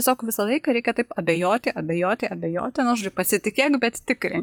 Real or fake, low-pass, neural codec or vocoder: fake; 19.8 kHz; vocoder, 44.1 kHz, 128 mel bands, Pupu-Vocoder